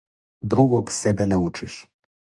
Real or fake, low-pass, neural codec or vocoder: fake; 10.8 kHz; codec, 44.1 kHz, 2.6 kbps, DAC